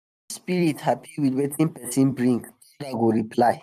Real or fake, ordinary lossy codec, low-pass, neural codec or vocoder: fake; none; 14.4 kHz; vocoder, 44.1 kHz, 128 mel bands every 256 samples, BigVGAN v2